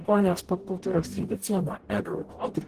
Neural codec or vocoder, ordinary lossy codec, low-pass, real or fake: codec, 44.1 kHz, 0.9 kbps, DAC; Opus, 16 kbps; 14.4 kHz; fake